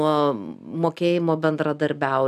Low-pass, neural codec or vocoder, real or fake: 14.4 kHz; none; real